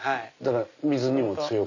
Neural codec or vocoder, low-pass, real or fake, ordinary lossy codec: none; 7.2 kHz; real; none